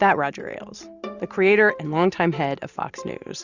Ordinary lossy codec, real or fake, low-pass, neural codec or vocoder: Opus, 64 kbps; real; 7.2 kHz; none